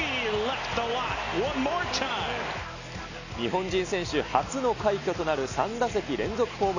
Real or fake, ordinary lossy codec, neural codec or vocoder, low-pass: real; none; none; 7.2 kHz